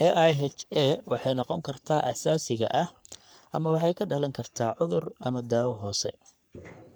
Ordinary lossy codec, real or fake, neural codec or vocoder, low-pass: none; fake; codec, 44.1 kHz, 3.4 kbps, Pupu-Codec; none